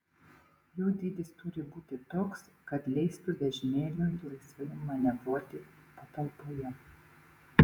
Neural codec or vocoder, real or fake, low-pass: vocoder, 48 kHz, 128 mel bands, Vocos; fake; 19.8 kHz